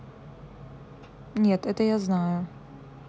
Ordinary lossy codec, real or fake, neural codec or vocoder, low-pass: none; real; none; none